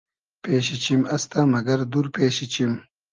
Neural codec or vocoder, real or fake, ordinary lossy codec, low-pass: none; real; Opus, 32 kbps; 7.2 kHz